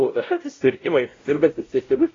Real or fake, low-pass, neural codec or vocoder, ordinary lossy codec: fake; 7.2 kHz; codec, 16 kHz, 0.5 kbps, X-Codec, WavLM features, trained on Multilingual LibriSpeech; AAC, 32 kbps